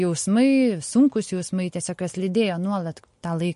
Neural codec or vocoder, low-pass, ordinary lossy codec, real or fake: none; 14.4 kHz; MP3, 48 kbps; real